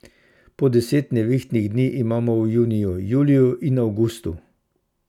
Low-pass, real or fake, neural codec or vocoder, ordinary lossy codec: 19.8 kHz; real; none; none